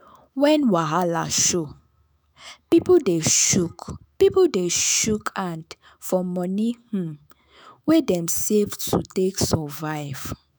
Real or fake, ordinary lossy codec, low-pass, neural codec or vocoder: fake; none; none; autoencoder, 48 kHz, 128 numbers a frame, DAC-VAE, trained on Japanese speech